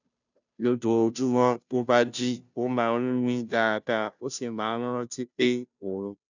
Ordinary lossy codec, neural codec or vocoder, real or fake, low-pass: none; codec, 16 kHz, 0.5 kbps, FunCodec, trained on Chinese and English, 25 frames a second; fake; 7.2 kHz